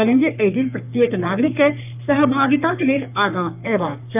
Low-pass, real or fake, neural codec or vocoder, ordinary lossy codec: 3.6 kHz; fake; codec, 44.1 kHz, 3.4 kbps, Pupu-Codec; none